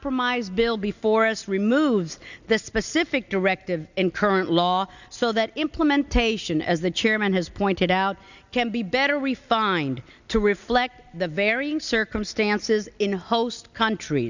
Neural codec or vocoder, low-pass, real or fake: none; 7.2 kHz; real